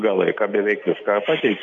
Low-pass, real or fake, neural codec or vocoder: 7.2 kHz; real; none